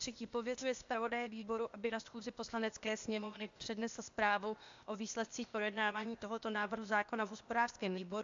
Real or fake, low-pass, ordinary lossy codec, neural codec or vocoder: fake; 7.2 kHz; AAC, 64 kbps; codec, 16 kHz, 0.8 kbps, ZipCodec